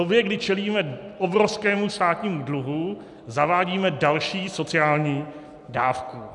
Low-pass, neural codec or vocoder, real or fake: 10.8 kHz; none; real